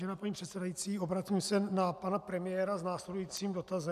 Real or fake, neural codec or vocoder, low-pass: real; none; 14.4 kHz